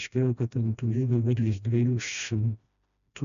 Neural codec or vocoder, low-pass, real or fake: codec, 16 kHz, 1 kbps, FreqCodec, smaller model; 7.2 kHz; fake